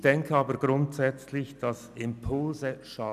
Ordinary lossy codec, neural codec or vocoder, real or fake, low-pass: none; none; real; 14.4 kHz